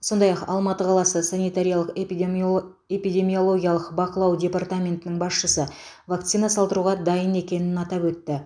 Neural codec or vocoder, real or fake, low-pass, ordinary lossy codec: none; real; 9.9 kHz; none